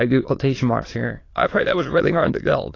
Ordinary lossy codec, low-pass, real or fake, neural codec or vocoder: AAC, 32 kbps; 7.2 kHz; fake; autoencoder, 22.05 kHz, a latent of 192 numbers a frame, VITS, trained on many speakers